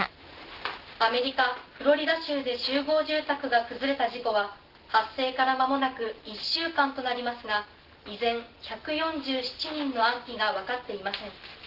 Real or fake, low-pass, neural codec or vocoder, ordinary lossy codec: real; 5.4 kHz; none; Opus, 16 kbps